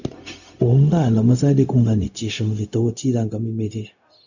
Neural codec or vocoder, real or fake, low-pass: codec, 16 kHz, 0.4 kbps, LongCat-Audio-Codec; fake; 7.2 kHz